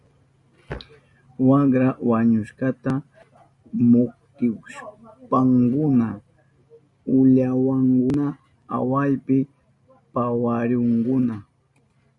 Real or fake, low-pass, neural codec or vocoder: real; 10.8 kHz; none